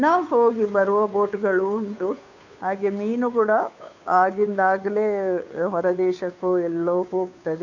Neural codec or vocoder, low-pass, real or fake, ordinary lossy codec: codec, 16 kHz, 2 kbps, FunCodec, trained on Chinese and English, 25 frames a second; 7.2 kHz; fake; none